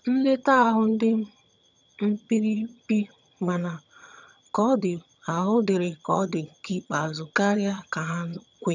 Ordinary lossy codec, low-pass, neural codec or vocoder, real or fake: none; 7.2 kHz; vocoder, 22.05 kHz, 80 mel bands, HiFi-GAN; fake